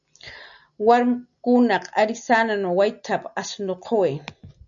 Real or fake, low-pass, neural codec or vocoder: real; 7.2 kHz; none